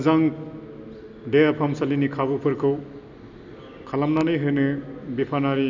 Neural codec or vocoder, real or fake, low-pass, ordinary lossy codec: none; real; 7.2 kHz; none